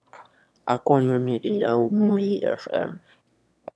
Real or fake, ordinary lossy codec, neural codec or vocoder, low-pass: fake; none; autoencoder, 22.05 kHz, a latent of 192 numbers a frame, VITS, trained on one speaker; none